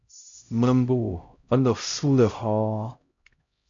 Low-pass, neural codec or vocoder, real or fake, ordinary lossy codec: 7.2 kHz; codec, 16 kHz, 0.5 kbps, X-Codec, HuBERT features, trained on LibriSpeech; fake; MP3, 48 kbps